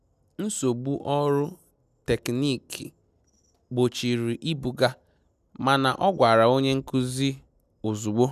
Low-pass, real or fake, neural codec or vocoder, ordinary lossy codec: 14.4 kHz; real; none; none